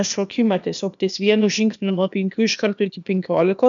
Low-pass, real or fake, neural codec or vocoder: 7.2 kHz; fake; codec, 16 kHz, 0.8 kbps, ZipCodec